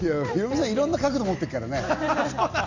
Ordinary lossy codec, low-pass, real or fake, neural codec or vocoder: none; 7.2 kHz; real; none